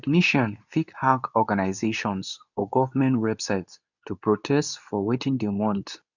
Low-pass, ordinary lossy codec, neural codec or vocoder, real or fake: 7.2 kHz; none; codec, 24 kHz, 0.9 kbps, WavTokenizer, medium speech release version 2; fake